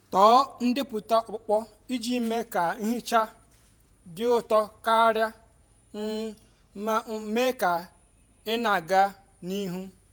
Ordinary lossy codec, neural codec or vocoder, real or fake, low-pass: none; vocoder, 48 kHz, 128 mel bands, Vocos; fake; none